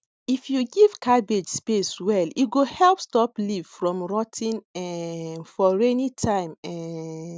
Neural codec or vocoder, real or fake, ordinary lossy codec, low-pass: none; real; none; none